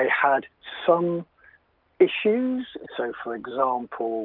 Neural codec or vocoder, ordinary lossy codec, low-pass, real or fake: none; Opus, 32 kbps; 5.4 kHz; real